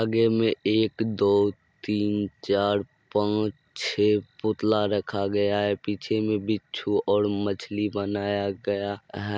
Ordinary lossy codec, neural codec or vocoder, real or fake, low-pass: none; none; real; none